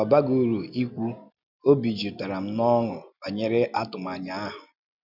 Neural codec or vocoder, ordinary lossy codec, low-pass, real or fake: none; none; 5.4 kHz; real